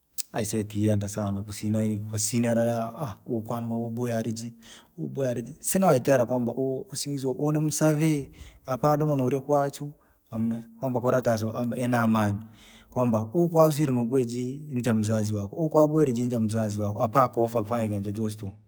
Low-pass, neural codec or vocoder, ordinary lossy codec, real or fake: none; codec, 44.1 kHz, 2.6 kbps, SNAC; none; fake